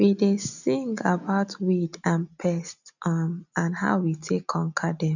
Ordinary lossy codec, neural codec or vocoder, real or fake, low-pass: none; none; real; 7.2 kHz